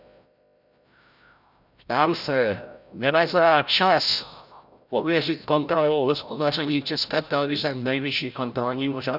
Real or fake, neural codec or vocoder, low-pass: fake; codec, 16 kHz, 0.5 kbps, FreqCodec, larger model; 5.4 kHz